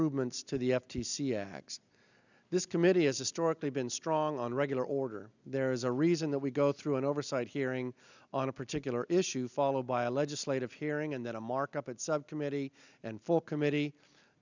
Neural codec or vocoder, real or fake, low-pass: none; real; 7.2 kHz